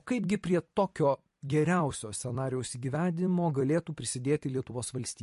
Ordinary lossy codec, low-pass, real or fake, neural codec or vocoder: MP3, 48 kbps; 14.4 kHz; fake; vocoder, 44.1 kHz, 128 mel bands every 256 samples, BigVGAN v2